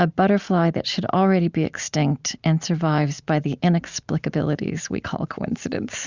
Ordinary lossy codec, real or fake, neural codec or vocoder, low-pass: Opus, 64 kbps; real; none; 7.2 kHz